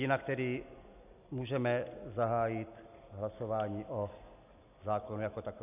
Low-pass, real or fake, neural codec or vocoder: 3.6 kHz; real; none